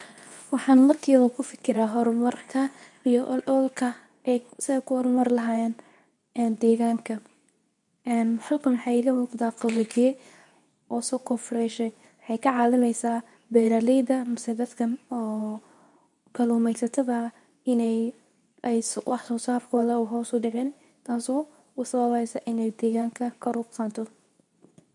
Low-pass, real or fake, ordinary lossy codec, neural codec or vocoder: 10.8 kHz; fake; none; codec, 24 kHz, 0.9 kbps, WavTokenizer, medium speech release version 2